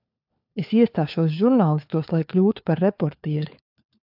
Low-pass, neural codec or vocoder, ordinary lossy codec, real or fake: 5.4 kHz; codec, 16 kHz, 4 kbps, FunCodec, trained on LibriTTS, 50 frames a second; AAC, 48 kbps; fake